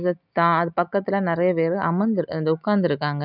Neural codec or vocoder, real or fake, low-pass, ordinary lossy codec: none; real; 5.4 kHz; none